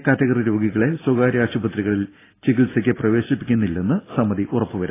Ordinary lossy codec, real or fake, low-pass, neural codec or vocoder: AAC, 16 kbps; real; 3.6 kHz; none